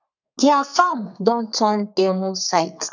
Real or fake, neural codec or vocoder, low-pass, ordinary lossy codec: fake; codec, 32 kHz, 1.9 kbps, SNAC; 7.2 kHz; none